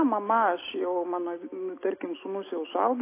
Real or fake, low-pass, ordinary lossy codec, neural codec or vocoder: real; 3.6 kHz; AAC, 24 kbps; none